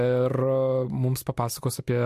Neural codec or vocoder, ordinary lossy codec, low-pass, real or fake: none; MP3, 64 kbps; 14.4 kHz; real